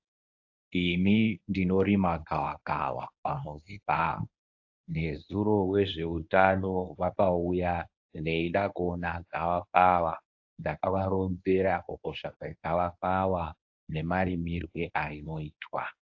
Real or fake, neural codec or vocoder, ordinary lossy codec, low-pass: fake; codec, 24 kHz, 0.9 kbps, WavTokenizer, medium speech release version 1; AAC, 48 kbps; 7.2 kHz